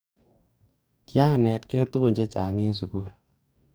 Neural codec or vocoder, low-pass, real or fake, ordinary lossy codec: codec, 44.1 kHz, 2.6 kbps, DAC; none; fake; none